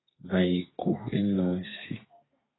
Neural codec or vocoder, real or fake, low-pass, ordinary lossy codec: codec, 32 kHz, 1.9 kbps, SNAC; fake; 7.2 kHz; AAC, 16 kbps